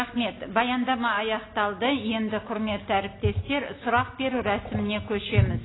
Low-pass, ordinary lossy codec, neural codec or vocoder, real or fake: 7.2 kHz; AAC, 16 kbps; none; real